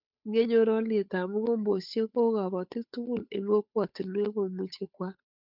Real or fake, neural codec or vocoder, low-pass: fake; codec, 16 kHz, 8 kbps, FunCodec, trained on Chinese and English, 25 frames a second; 5.4 kHz